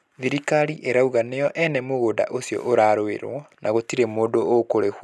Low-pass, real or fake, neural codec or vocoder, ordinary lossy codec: none; real; none; none